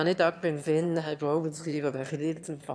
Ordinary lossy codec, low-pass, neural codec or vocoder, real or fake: none; none; autoencoder, 22.05 kHz, a latent of 192 numbers a frame, VITS, trained on one speaker; fake